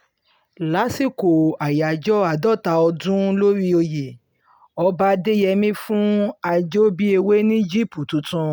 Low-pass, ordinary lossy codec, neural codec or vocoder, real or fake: none; none; none; real